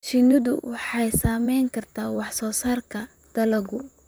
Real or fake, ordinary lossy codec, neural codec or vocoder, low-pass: fake; none; vocoder, 44.1 kHz, 128 mel bands, Pupu-Vocoder; none